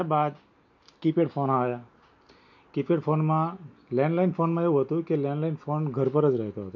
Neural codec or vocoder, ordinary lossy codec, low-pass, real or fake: none; none; 7.2 kHz; real